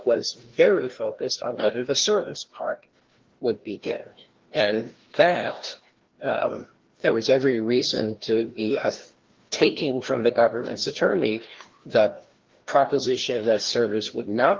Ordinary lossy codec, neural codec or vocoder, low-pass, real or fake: Opus, 16 kbps; codec, 16 kHz, 1 kbps, FreqCodec, larger model; 7.2 kHz; fake